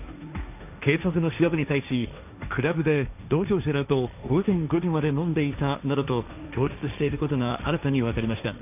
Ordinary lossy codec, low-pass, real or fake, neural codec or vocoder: none; 3.6 kHz; fake; codec, 16 kHz, 1.1 kbps, Voila-Tokenizer